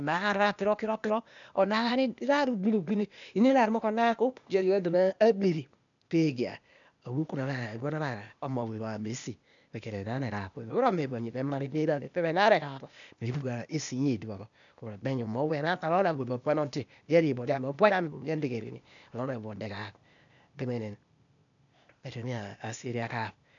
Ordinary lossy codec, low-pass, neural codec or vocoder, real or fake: none; 7.2 kHz; codec, 16 kHz, 0.8 kbps, ZipCodec; fake